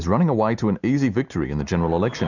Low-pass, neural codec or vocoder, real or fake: 7.2 kHz; none; real